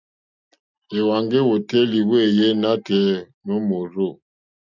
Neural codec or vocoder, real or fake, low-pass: none; real; 7.2 kHz